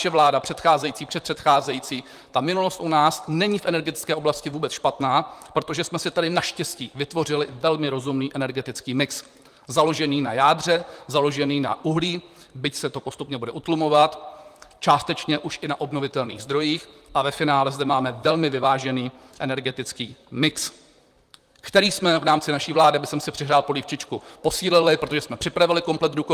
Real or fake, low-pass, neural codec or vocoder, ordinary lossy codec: fake; 14.4 kHz; vocoder, 44.1 kHz, 128 mel bands, Pupu-Vocoder; Opus, 32 kbps